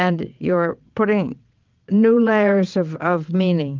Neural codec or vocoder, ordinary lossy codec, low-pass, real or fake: vocoder, 22.05 kHz, 80 mel bands, WaveNeXt; Opus, 24 kbps; 7.2 kHz; fake